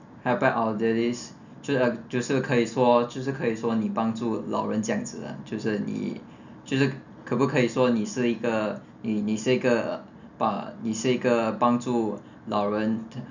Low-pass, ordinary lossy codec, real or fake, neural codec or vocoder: 7.2 kHz; none; real; none